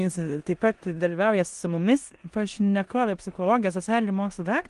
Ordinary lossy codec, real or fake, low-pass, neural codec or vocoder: Opus, 24 kbps; fake; 10.8 kHz; codec, 16 kHz in and 24 kHz out, 0.9 kbps, LongCat-Audio-Codec, four codebook decoder